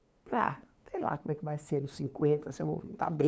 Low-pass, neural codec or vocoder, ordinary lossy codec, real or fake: none; codec, 16 kHz, 2 kbps, FunCodec, trained on LibriTTS, 25 frames a second; none; fake